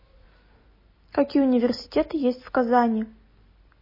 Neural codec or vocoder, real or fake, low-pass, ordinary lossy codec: none; real; 5.4 kHz; MP3, 24 kbps